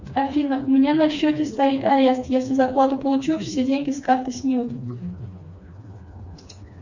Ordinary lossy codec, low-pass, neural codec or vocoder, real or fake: Opus, 64 kbps; 7.2 kHz; codec, 16 kHz, 2 kbps, FreqCodec, smaller model; fake